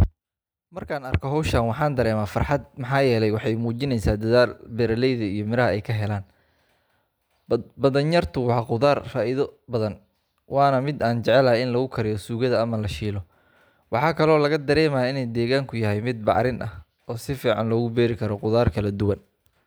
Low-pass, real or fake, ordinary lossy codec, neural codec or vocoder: none; real; none; none